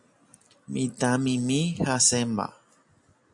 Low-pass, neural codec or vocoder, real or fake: 10.8 kHz; none; real